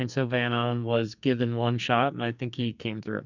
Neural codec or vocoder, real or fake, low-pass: codec, 44.1 kHz, 2.6 kbps, SNAC; fake; 7.2 kHz